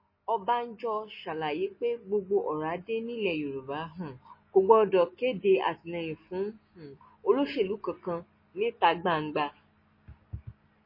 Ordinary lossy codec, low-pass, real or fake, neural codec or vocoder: MP3, 24 kbps; 5.4 kHz; real; none